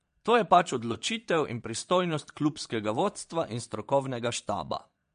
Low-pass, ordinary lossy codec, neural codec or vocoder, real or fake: 9.9 kHz; MP3, 48 kbps; vocoder, 22.05 kHz, 80 mel bands, Vocos; fake